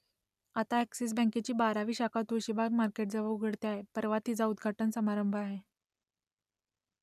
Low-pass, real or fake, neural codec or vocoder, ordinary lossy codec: 14.4 kHz; real; none; none